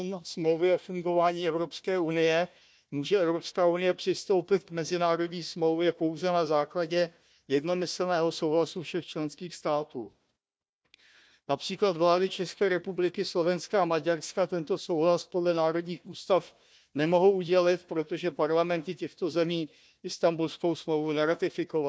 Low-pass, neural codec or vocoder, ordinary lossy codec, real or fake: none; codec, 16 kHz, 1 kbps, FunCodec, trained on Chinese and English, 50 frames a second; none; fake